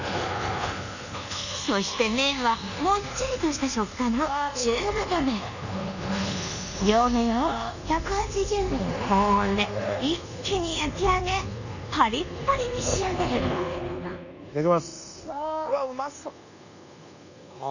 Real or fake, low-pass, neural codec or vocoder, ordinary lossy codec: fake; 7.2 kHz; codec, 24 kHz, 1.2 kbps, DualCodec; none